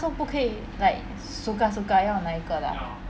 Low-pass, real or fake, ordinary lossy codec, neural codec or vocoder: none; real; none; none